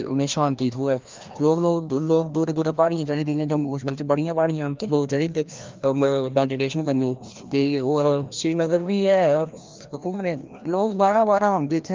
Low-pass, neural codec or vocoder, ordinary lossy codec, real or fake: 7.2 kHz; codec, 16 kHz, 1 kbps, FreqCodec, larger model; Opus, 24 kbps; fake